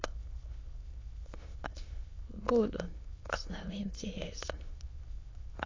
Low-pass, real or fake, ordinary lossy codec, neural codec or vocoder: 7.2 kHz; fake; AAC, 32 kbps; autoencoder, 22.05 kHz, a latent of 192 numbers a frame, VITS, trained on many speakers